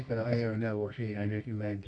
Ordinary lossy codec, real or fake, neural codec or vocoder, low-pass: none; fake; codec, 24 kHz, 0.9 kbps, WavTokenizer, medium music audio release; 9.9 kHz